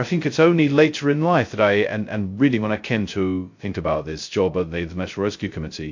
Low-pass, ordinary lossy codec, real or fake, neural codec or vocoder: 7.2 kHz; MP3, 48 kbps; fake; codec, 16 kHz, 0.2 kbps, FocalCodec